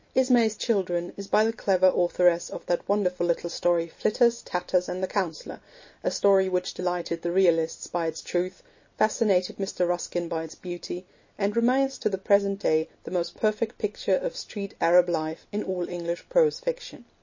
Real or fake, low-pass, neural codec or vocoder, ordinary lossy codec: real; 7.2 kHz; none; MP3, 32 kbps